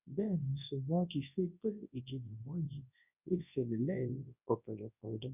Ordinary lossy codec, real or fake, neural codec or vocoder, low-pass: none; fake; codec, 24 kHz, 0.9 kbps, WavTokenizer, large speech release; 3.6 kHz